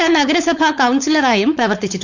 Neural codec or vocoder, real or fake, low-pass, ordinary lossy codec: codec, 16 kHz, 4.8 kbps, FACodec; fake; 7.2 kHz; none